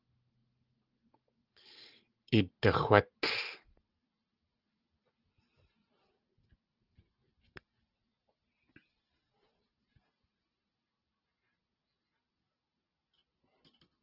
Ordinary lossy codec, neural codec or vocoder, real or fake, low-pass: Opus, 16 kbps; none; real; 5.4 kHz